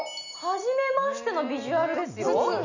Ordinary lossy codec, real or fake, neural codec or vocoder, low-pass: none; real; none; 7.2 kHz